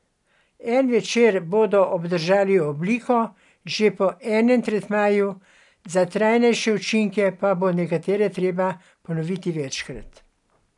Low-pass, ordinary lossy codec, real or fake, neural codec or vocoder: 10.8 kHz; none; real; none